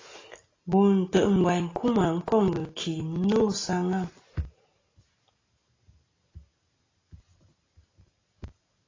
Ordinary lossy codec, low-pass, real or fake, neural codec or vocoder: AAC, 32 kbps; 7.2 kHz; real; none